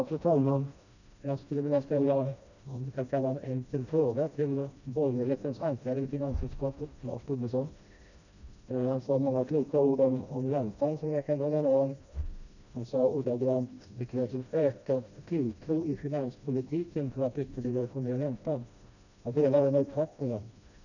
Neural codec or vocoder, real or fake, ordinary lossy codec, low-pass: codec, 16 kHz, 1 kbps, FreqCodec, smaller model; fake; none; 7.2 kHz